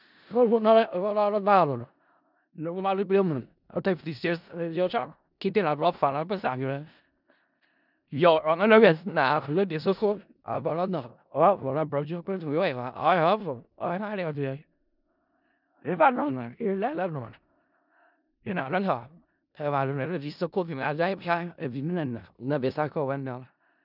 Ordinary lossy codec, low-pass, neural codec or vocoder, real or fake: none; 5.4 kHz; codec, 16 kHz in and 24 kHz out, 0.4 kbps, LongCat-Audio-Codec, four codebook decoder; fake